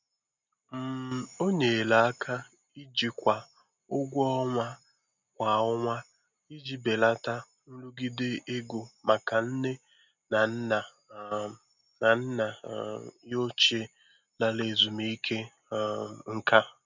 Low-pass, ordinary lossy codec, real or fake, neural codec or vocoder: 7.2 kHz; none; real; none